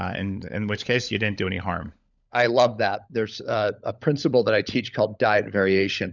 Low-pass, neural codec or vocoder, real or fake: 7.2 kHz; codec, 16 kHz, 16 kbps, FunCodec, trained on LibriTTS, 50 frames a second; fake